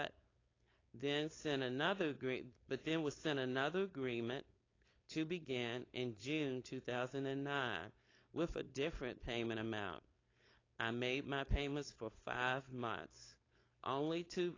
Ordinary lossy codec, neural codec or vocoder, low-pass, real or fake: AAC, 32 kbps; codec, 16 kHz, 4.8 kbps, FACodec; 7.2 kHz; fake